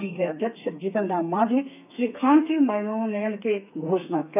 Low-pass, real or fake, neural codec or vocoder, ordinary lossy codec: 3.6 kHz; fake; codec, 32 kHz, 1.9 kbps, SNAC; none